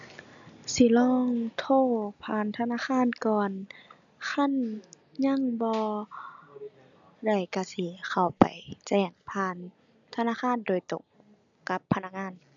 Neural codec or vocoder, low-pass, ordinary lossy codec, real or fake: none; 7.2 kHz; none; real